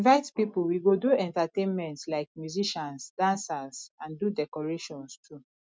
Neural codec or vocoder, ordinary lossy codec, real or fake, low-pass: none; none; real; none